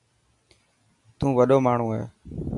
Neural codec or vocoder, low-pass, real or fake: none; 10.8 kHz; real